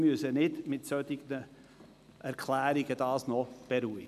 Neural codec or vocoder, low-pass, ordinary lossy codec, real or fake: none; 14.4 kHz; none; real